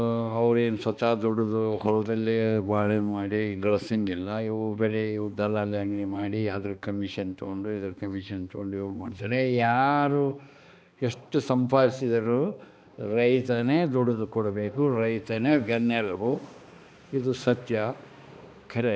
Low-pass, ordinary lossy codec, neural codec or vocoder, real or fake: none; none; codec, 16 kHz, 2 kbps, X-Codec, HuBERT features, trained on balanced general audio; fake